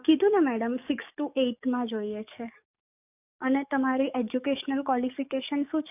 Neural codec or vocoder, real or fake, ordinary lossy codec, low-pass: codec, 44.1 kHz, 7.8 kbps, DAC; fake; none; 3.6 kHz